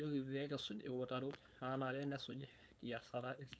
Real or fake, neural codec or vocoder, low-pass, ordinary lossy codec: fake; codec, 16 kHz, 4.8 kbps, FACodec; none; none